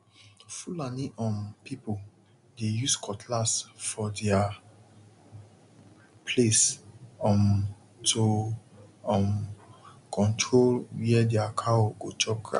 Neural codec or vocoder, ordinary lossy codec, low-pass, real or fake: none; none; 10.8 kHz; real